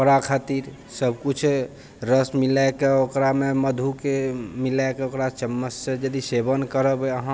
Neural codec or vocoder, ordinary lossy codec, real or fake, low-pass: none; none; real; none